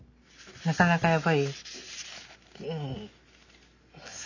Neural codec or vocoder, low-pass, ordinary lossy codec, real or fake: none; 7.2 kHz; none; real